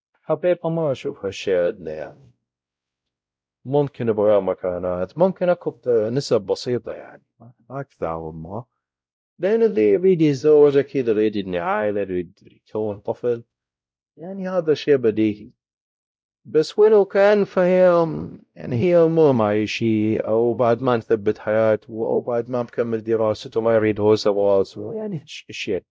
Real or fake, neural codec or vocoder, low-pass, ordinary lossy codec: fake; codec, 16 kHz, 0.5 kbps, X-Codec, WavLM features, trained on Multilingual LibriSpeech; none; none